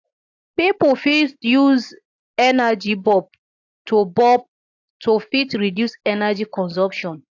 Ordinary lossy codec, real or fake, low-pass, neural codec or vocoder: AAC, 48 kbps; real; 7.2 kHz; none